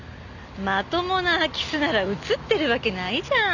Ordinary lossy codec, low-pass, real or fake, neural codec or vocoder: none; 7.2 kHz; real; none